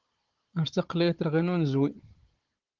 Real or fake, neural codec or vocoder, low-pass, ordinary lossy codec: fake; codec, 16 kHz, 16 kbps, FunCodec, trained on Chinese and English, 50 frames a second; 7.2 kHz; Opus, 16 kbps